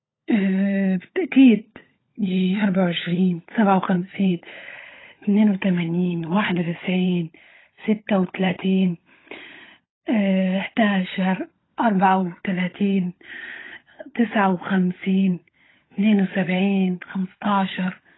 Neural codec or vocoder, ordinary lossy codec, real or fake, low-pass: codec, 16 kHz, 16 kbps, FunCodec, trained on LibriTTS, 50 frames a second; AAC, 16 kbps; fake; 7.2 kHz